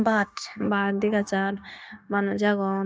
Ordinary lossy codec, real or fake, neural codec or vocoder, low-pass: none; fake; codec, 16 kHz, 0.9 kbps, LongCat-Audio-Codec; none